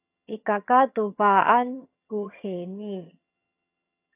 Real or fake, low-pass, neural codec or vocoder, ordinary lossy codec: fake; 3.6 kHz; vocoder, 22.05 kHz, 80 mel bands, HiFi-GAN; AAC, 32 kbps